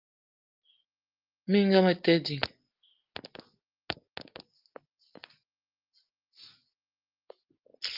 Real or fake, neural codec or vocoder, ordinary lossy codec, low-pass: real; none; Opus, 32 kbps; 5.4 kHz